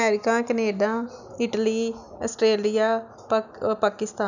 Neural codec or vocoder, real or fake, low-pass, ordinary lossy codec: none; real; 7.2 kHz; none